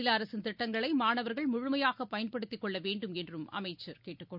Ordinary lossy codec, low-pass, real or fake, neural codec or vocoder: none; 5.4 kHz; real; none